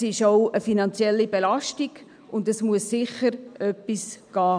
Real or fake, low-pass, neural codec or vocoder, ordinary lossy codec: real; 9.9 kHz; none; none